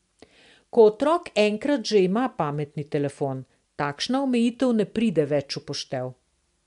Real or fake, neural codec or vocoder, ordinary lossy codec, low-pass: real; none; MP3, 64 kbps; 10.8 kHz